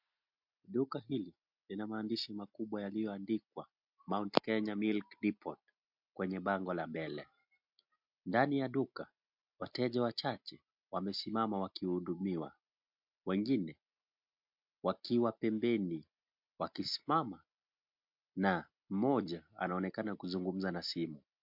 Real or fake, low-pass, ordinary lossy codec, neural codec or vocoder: real; 5.4 kHz; MP3, 48 kbps; none